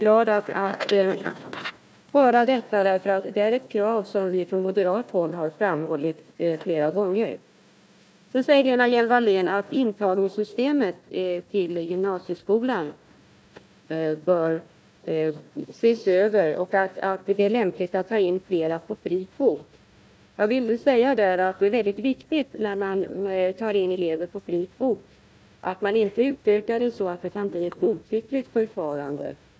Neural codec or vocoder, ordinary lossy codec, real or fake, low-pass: codec, 16 kHz, 1 kbps, FunCodec, trained on Chinese and English, 50 frames a second; none; fake; none